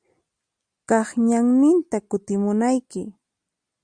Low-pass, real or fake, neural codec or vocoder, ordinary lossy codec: 9.9 kHz; real; none; Opus, 64 kbps